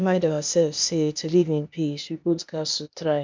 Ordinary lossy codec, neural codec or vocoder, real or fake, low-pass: MP3, 64 kbps; codec, 16 kHz, 0.8 kbps, ZipCodec; fake; 7.2 kHz